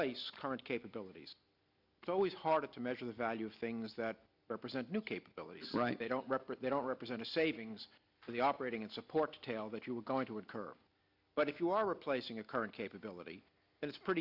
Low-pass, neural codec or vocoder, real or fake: 5.4 kHz; none; real